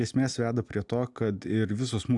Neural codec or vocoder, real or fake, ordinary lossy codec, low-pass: none; real; AAC, 64 kbps; 10.8 kHz